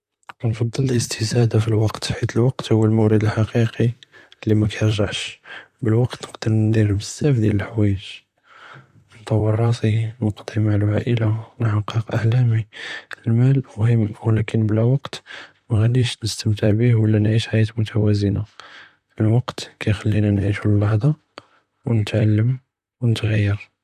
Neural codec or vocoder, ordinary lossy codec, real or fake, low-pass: vocoder, 44.1 kHz, 128 mel bands, Pupu-Vocoder; none; fake; 14.4 kHz